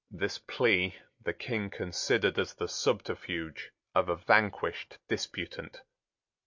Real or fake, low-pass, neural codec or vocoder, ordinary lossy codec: real; 7.2 kHz; none; MP3, 64 kbps